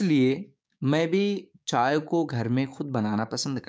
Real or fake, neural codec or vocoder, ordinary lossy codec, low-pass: fake; codec, 16 kHz, 6 kbps, DAC; none; none